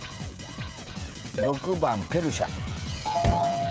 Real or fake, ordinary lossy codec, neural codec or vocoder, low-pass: fake; none; codec, 16 kHz, 16 kbps, FreqCodec, smaller model; none